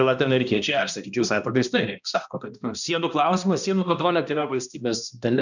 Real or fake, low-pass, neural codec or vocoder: fake; 7.2 kHz; codec, 16 kHz, 1 kbps, X-Codec, HuBERT features, trained on balanced general audio